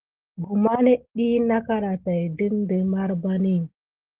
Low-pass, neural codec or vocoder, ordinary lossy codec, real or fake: 3.6 kHz; none; Opus, 16 kbps; real